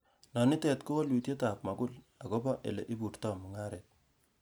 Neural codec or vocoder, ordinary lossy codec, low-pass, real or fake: none; none; none; real